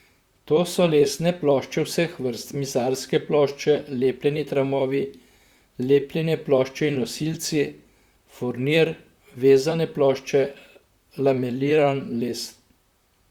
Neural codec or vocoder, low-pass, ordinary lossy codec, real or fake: vocoder, 44.1 kHz, 128 mel bands, Pupu-Vocoder; 19.8 kHz; Opus, 64 kbps; fake